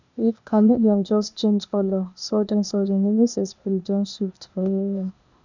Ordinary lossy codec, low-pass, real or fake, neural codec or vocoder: none; 7.2 kHz; fake; codec, 16 kHz, 0.8 kbps, ZipCodec